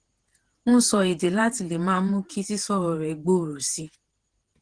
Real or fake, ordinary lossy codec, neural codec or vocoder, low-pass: fake; Opus, 16 kbps; vocoder, 48 kHz, 128 mel bands, Vocos; 9.9 kHz